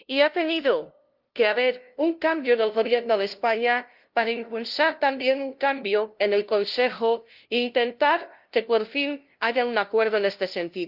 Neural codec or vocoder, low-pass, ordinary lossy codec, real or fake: codec, 16 kHz, 0.5 kbps, FunCodec, trained on LibriTTS, 25 frames a second; 5.4 kHz; Opus, 32 kbps; fake